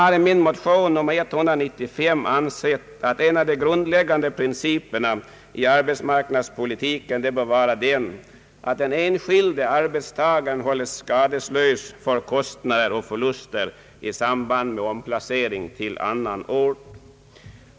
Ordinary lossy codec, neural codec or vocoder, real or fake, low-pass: none; none; real; none